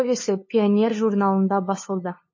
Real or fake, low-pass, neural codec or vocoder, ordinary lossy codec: fake; 7.2 kHz; codec, 16 kHz, 2 kbps, FunCodec, trained on LibriTTS, 25 frames a second; MP3, 32 kbps